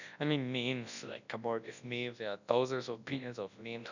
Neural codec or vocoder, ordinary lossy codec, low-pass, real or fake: codec, 24 kHz, 0.9 kbps, WavTokenizer, large speech release; none; 7.2 kHz; fake